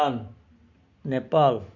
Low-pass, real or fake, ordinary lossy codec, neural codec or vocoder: 7.2 kHz; real; none; none